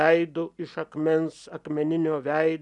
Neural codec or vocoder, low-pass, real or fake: none; 10.8 kHz; real